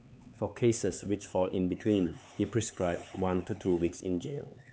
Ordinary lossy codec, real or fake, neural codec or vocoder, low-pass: none; fake; codec, 16 kHz, 4 kbps, X-Codec, HuBERT features, trained on LibriSpeech; none